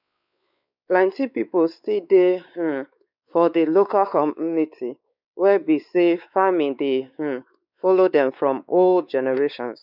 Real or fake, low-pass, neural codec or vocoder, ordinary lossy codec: fake; 5.4 kHz; codec, 16 kHz, 4 kbps, X-Codec, WavLM features, trained on Multilingual LibriSpeech; none